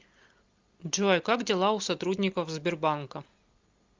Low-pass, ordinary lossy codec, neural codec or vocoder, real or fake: 7.2 kHz; Opus, 24 kbps; none; real